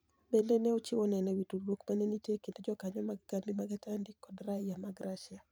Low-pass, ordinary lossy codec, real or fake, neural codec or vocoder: none; none; fake; vocoder, 44.1 kHz, 128 mel bands every 512 samples, BigVGAN v2